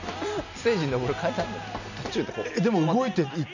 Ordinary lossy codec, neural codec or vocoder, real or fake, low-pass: none; none; real; 7.2 kHz